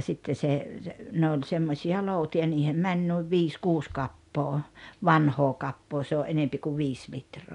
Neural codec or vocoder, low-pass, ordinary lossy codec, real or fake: none; 10.8 kHz; none; real